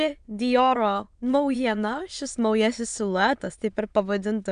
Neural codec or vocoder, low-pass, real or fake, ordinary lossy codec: autoencoder, 22.05 kHz, a latent of 192 numbers a frame, VITS, trained on many speakers; 9.9 kHz; fake; MP3, 96 kbps